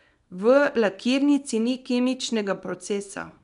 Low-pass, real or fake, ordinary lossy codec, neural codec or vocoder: 10.8 kHz; fake; none; codec, 24 kHz, 0.9 kbps, WavTokenizer, small release